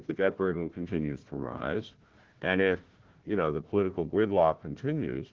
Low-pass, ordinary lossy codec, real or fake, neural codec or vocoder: 7.2 kHz; Opus, 16 kbps; fake; codec, 16 kHz, 1 kbps, FunCodec, trained on Chinese and English, 50 frames a second